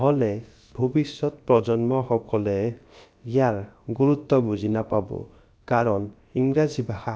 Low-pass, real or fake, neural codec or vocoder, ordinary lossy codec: none; fake; codec, 16 kHz, about 1 kbps, DyCAST, with the encoder's durations; none